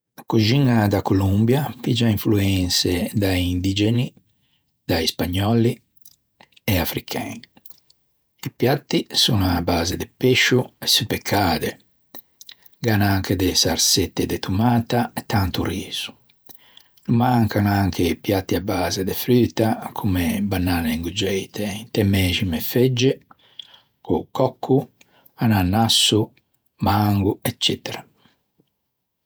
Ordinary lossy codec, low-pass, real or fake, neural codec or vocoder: none; none; fake; vocoder, 48 kHz, 128 mel bands, Vocos